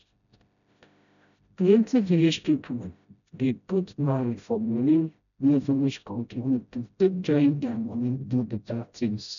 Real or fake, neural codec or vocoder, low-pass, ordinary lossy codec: fake; codec, 16 kHz, 0.5 kbps, FreqCodec, smaller model; 7.2 kHz; none